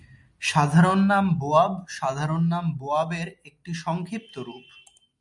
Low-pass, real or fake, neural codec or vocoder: 10.8 kHz; real; none